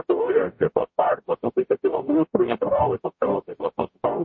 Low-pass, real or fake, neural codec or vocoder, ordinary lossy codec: 7.2 kHz; fake; codec, 44.1 kHz, 0.9 kbps, DAC; MP3, 32 kbps